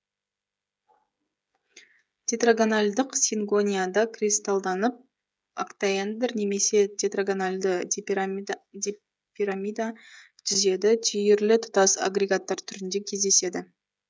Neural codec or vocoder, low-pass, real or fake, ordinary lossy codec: codec, 16 kHz, 16 kbps, FreqCodec, smaller model; none; fake; none